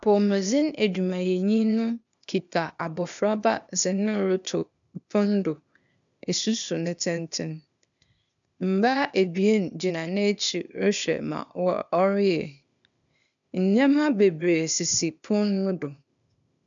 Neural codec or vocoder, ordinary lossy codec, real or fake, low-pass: codec, 16 kHz, 0.8 kbps, ZipCodec; MP3, 96 kbps; fake; 7.2 kHz